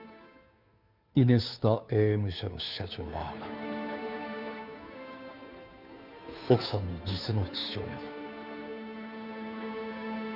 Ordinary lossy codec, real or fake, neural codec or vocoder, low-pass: none; fake; codec, 16 kHz, 2 kbps, FunCodec, trained on Chinese and English, 25 frames a second; 5.4 kHz